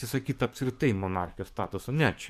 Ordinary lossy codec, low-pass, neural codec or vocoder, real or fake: MP3, 96 kbps; 14.4 kHz; codec, 44.1 kHz, 7.8 kbps, DAC; fake